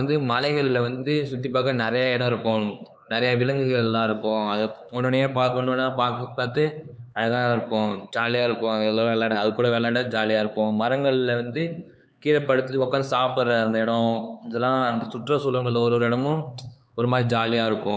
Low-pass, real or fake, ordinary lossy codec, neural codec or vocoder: none; fake; none; codec, 16 kHz, 4 kbps, X-Codec, HuBERT features, trained on LibriSpeech